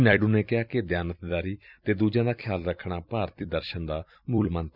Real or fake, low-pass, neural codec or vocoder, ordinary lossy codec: fake; 5.4 kHz; vocoder, 44.1 kHz, 128 mel bands every 256 samples, BigVGAN v2; none